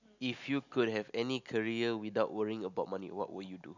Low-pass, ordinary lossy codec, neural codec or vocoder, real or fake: 7.2 kHz; none; none; real